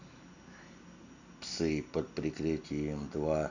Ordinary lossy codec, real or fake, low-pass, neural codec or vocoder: none; real; 7.2 kHz; none